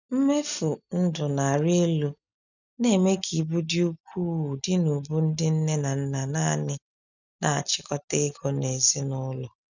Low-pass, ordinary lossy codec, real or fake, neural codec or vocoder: 7.2 kHz; none; real; none